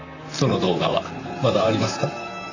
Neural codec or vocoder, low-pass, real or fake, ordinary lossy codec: vocoder, 44.1 kHz, 128 mel bands, Pupu-Vocoder; 7.2 kHz; fake; none